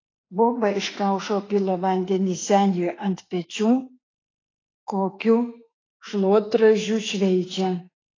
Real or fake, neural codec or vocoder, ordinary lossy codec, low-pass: fake; autoencoder, 48 kHz, 32 numbers a frame, DAC-VAE, trained on Japanese speech; AAC, 32 kbps; 7.2 kHz